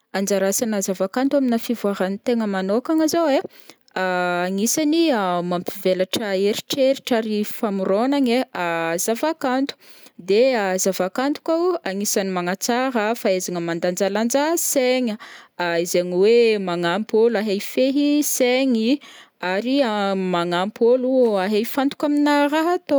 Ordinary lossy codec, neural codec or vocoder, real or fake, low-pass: none; none; real; none